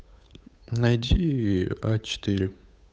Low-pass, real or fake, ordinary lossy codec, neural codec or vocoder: none; fake; none; codec, 16 kHz, 8 kbps, FunCodec, trained on Chinese and English, 25 frames a second